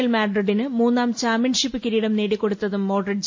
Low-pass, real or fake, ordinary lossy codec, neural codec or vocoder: 7.2 kHz; real; MP3, 32 kbps; none